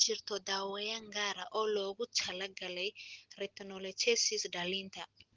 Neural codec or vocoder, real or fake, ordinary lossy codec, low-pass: none; real; Opus, 16 kbps; 7.2 kHz